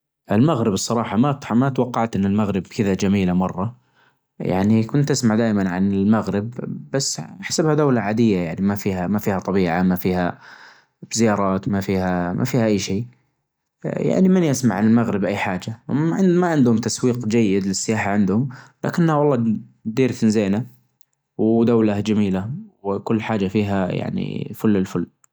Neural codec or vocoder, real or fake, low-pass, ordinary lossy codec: none; real; none; none